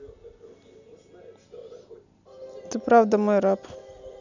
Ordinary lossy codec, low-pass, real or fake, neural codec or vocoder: none; 7.2 kHz; real; none